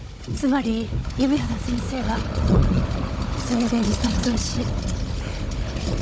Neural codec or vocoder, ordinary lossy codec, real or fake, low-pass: codec, 16 kHz, 16 kbps, FunCodec, trained on Chinese and English, 50 frames a second; none; fake; none